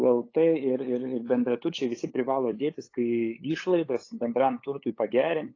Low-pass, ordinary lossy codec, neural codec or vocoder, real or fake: 7.2 kHz; AAC, 32 kbps; codec, 16 kHz, 8 kbps, FunCodec, trained on LibriTTS, 25 frames a second; fake